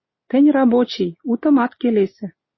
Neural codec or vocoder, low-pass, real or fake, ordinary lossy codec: none; 7.2 kHz; real; MP3, 24 kbps